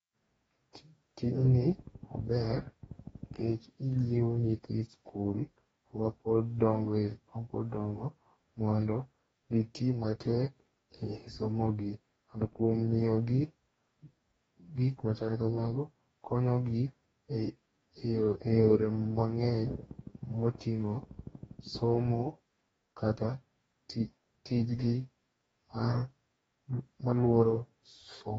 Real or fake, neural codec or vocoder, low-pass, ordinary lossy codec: fake; codec, 44.1 kHz, 2.6 kbps, DAC; 19.8 kHz; AAC, 24 kbps